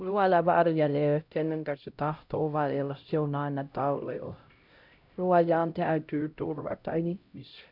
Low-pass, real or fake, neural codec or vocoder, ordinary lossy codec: 5.4 kHz; fake; codec, 16 kHz, 0.5 kbps, X-Codec, HuBERT features, trained on LibriSpeech; none